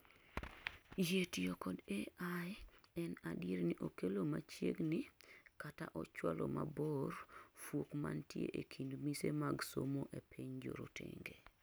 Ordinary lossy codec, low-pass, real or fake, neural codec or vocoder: none; none; real; none